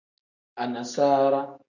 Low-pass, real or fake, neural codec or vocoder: 7.2 kHz; real; none